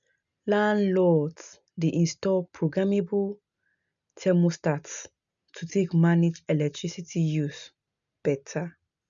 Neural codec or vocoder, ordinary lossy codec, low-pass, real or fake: none; none; 7.2 kHz; real